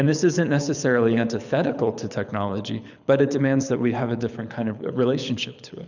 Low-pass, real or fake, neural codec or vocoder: 7.2 kHz; fake; codec, 24 kHz, 6 kbps, HILCodec